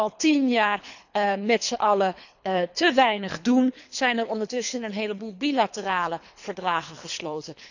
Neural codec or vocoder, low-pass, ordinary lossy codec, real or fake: codec, 24 kHz, 3 kbps, HILCodec; 7.2 kHz; none; fake